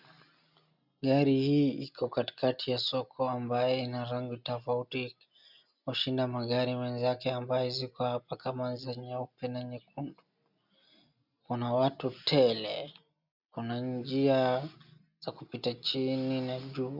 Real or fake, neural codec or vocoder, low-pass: real; none; 5.4 kHz